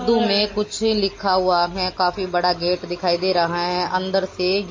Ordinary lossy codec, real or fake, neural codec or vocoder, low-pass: MP3, 32 kbps; real; none; 7.2 kHz